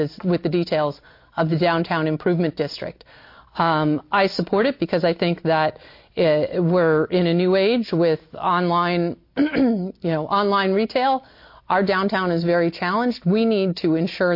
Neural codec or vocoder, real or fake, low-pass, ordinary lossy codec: none; real; 5.4 kHz; MP3, 32 kbps